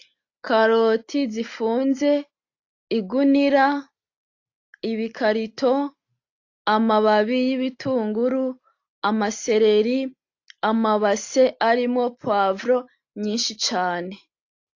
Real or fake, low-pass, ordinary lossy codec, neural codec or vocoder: real; 7.2 kHz; AAC, 48 kbps; none